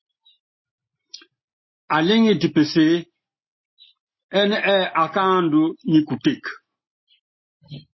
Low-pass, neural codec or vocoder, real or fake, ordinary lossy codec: 7.2 kHz; none; real; MP3, 24 kbps